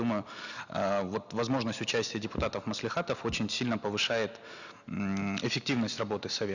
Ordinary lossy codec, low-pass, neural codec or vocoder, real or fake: none; 7.2 kHz; none; real